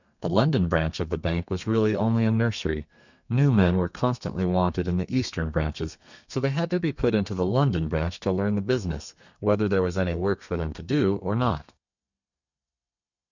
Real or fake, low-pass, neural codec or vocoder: fake; 7.2 kHz; codec, 32 kHz, 1.9 kbps, SNAC